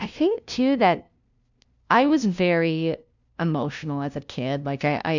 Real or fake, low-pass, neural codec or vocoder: fake; 7.2 kHz; codec, 16 kHz, 0.5 kbps, FunCodec, trained on LibriTTS, 25 frames a second